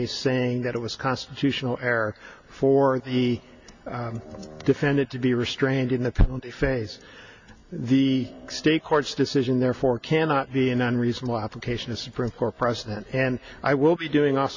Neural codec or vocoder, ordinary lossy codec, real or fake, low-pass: none; MP3, 64 kbps; real; 7.2 kHz